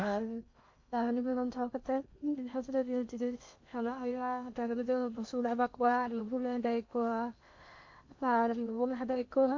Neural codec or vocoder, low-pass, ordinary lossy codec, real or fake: codec, 16 kHz in and 24 kHz out, 0.8 kbps, FocalCodec, streaming, 65536 codes; 7.2 kHz; MP3, 48 kbps; fake